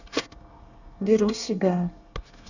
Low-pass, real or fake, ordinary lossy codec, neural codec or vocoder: 7.2 kHz; fake; none; codec, 24 kHz, 1 kbps, SNAC